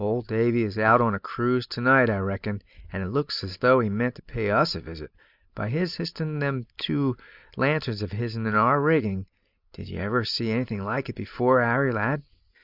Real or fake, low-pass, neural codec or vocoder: real; 5.4 kHz; none